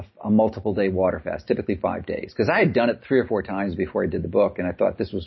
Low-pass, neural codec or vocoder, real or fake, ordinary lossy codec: 7.2 kHz; none; real; MP3, 24 kbps